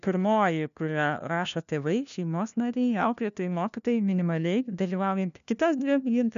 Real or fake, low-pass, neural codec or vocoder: fake; 7.2 kHz; codec, 16 kHz, 1 kbps, FunCodec, trained on LibriTTS, 50 frames a second